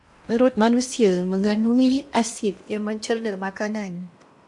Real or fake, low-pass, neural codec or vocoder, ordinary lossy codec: fake; 10.8 kHz; codec, 16 kHz in and 24 kHz out, 0.8 kbps, FocalCodec, streaming, 65536 codes; AAC, 64 kbps